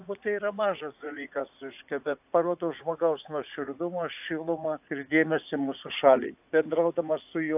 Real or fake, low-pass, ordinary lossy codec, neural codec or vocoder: fake; 3.6 kHz; AAC, 32 kbps; vocoder, 44.1 kHz, 80 mel bands, Vocos